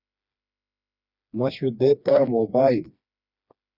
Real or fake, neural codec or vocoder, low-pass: fake; codec, 16 kHz, 2 kbps, FreqCodec, smaller model; 5.4 kHz